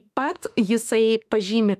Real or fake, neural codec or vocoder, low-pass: fake; autoencoder, 48 kHz, 32 numbers a frame, DAC-VAE, trained on Japanese speech; 14.4 kHz